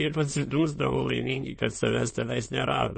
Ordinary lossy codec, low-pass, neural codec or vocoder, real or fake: MP3, 32 kbps; 9.9 kHz; autoencoder, 22.05 kHz, a latent of 192 numbers a frame, VITS, trained on many speakers; fake